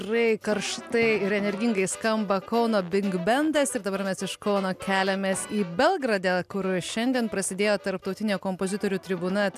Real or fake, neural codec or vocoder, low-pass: real; none; 14.4 kHz